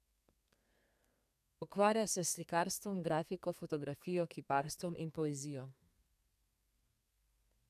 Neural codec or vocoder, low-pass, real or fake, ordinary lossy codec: codec, 32 kHz, 1.9 kbps, SNAC; 14.4 kHz; fake; none